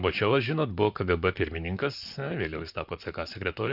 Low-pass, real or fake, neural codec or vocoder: 5.4 kHz; fake; vocoder, 44.1 kHz, 128 mel bands, Pupu-Vocoder